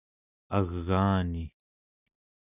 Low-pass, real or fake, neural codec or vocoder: 3.6 kHz; real; none